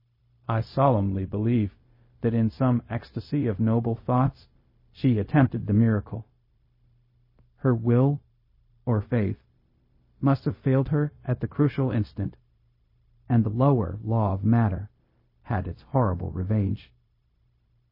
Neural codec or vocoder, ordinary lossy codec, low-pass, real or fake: codec, 16 kHz, 0.4 kbps, LongCat-Audio-Codec; MP3, 24 kbps; 5.4 kHz; fake